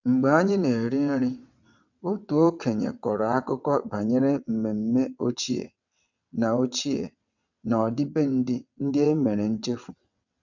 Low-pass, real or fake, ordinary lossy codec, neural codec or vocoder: 7.2 kHz; fake; none; vocoder, 22.05 kHz, 80 mel bands, WaveNeXt